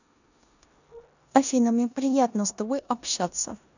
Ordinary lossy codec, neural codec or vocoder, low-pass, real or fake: none; codec, 16 kHz in and 24 kHz out, 0.9 kbps, LongCat-Audio-Codec, four codebook decoder; 7.2 kHz; fake